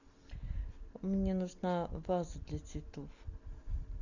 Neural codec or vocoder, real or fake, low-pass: none; real; 7.2 kHz